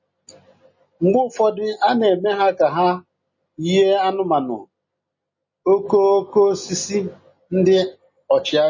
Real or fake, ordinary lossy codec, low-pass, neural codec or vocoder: real; MP3, 32 kbps; 7.2 kHz; none